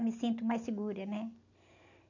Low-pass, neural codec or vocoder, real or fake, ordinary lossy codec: 7.2 kHz; none; real; none